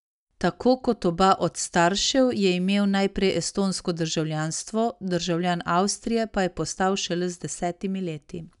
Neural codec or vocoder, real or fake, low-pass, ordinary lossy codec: none; real; 10.8 kHz; none